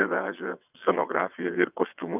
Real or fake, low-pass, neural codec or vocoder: fake; 3.6 kHz; vocoder, 22.05 kHz, 80 mel bands, WaveNeXt